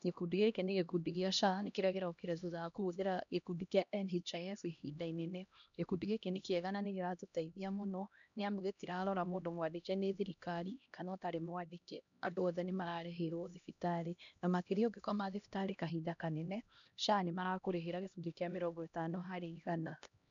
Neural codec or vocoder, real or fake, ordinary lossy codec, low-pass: codec, 16 kHz, 1 kbps, X-Codec, HuBERT features, trained on LibriSpeech; fake; none; 7.2 kHz